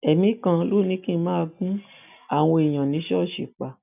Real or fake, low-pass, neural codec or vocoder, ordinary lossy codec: real; 3.6 kHz; none; none